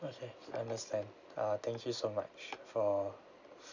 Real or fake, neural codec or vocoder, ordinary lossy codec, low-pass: real; none; none; 7.2 kHz